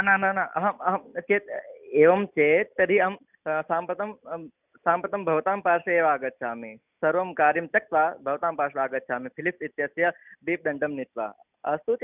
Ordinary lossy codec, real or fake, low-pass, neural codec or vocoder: none; real; 3.6 kHz; none